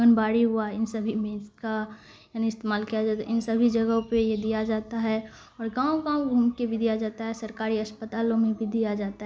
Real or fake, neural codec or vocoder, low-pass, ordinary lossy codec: real; none; none; none